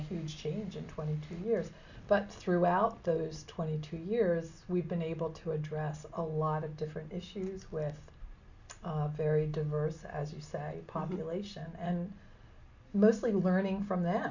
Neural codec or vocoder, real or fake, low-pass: none; real; 7.2 kHz